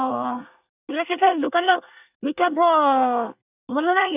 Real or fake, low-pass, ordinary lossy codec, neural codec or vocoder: fake; 3.6 kHz; none; codec, 24 kHz, 1 kbps, SNAC